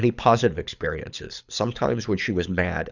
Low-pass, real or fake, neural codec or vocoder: 7.2 kHz; fake; codec, 24 kHz, 6 kbps, HILCodec